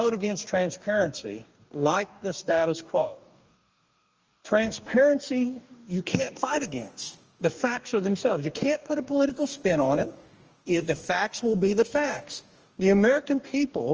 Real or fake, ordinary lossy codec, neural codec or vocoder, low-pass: fake; Opus, 24 kbps; codec, 44.1 kHz, 2.6 kbps, DAC; 7.2 kHz